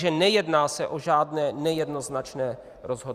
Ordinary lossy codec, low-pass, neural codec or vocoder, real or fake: Opus, 64 kbps; 14.4 kHz; vocoder, 44.1 kHz, 128 mel bands every 512 samples, BigVGAN v2; fake